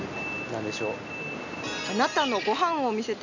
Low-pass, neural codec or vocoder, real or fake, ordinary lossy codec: 7.2 kHz; none; real; none